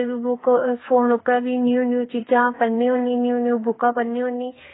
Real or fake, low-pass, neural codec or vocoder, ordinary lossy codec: fake; 7.2 kHz; codec, 44.1 kHz, 2.6 kbps, SNAC; AAC, 16 kbps